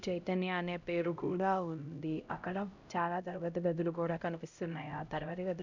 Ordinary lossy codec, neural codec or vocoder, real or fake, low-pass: none; codec, 16 kHz, 0.5 kbps, X-Codec, HuBERT features, trained on LibriSpeech; fake; 7.2 kHz